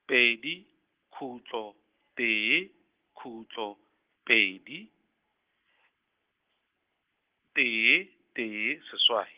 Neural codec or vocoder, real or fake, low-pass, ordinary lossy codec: none; real; 3.6 kHz; Opus, 16 kbps